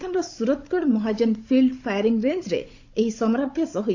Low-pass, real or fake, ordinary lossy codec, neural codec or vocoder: 7.2 kHz; fake; AAC, 48 kbps; codec, 16 kHz, 16 kbps, FunCodec, trained on Chinese and English, 50 frames a second